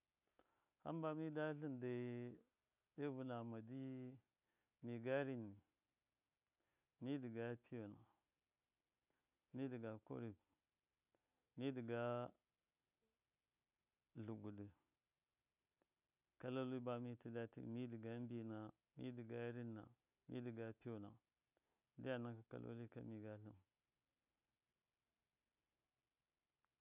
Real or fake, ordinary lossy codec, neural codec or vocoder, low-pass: real; none; none; 3.6 kHz